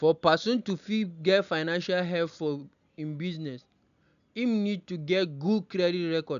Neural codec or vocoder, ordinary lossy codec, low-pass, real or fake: none; none; 7.2 kHz; real